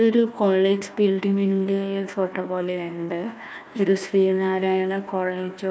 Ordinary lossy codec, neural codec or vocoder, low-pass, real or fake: none; codec, 16 kHz, 1 kbps, FunCodec, trained on Chinese and English, 50 frames a second; none; fake